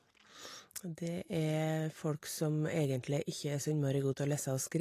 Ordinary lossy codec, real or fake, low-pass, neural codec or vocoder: AAC, 48 kbps; real; 14.4 kHz; none